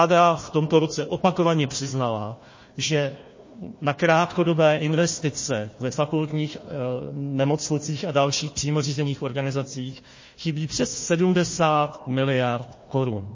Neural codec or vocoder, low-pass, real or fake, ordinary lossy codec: codec, 16 kHz, 1 kbps, FunCodec, trained on Chinese and English, 50 frames a second; 7.2 kHz; fake; MP3, 32 kbps